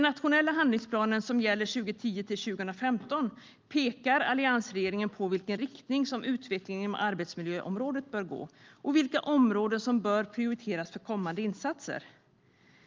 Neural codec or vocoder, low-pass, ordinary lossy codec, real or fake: none; 7.2 kHz; Opus, 32 kbps; real